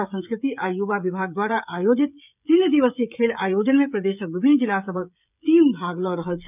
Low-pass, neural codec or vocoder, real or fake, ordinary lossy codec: 3.6 kHz; codec, 16 kHz, 16 kbps, FreqCodec, smaller model; fake; none